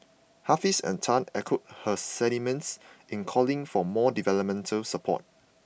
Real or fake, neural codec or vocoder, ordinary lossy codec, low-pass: real; none; none; none